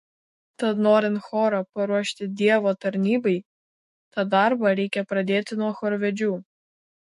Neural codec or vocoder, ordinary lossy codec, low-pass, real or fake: autoencoder, 48 kHz, 128 numbers a frame, DAC-VAE, trained on Japanese speech; MP3, 48 kbps; 14.4 kHz; fake